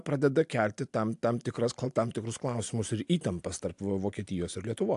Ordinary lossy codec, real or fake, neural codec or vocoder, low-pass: AAC, 64 kbps; real; none; 10.8 kHz